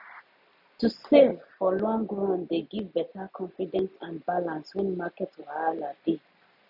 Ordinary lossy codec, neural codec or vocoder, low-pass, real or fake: none; none; 5.4 kHz; real